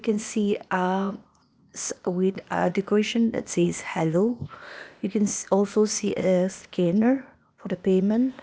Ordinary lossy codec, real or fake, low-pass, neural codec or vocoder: none; fake; none; codec, 16 kHz, 0.8 kbps, ZipCodec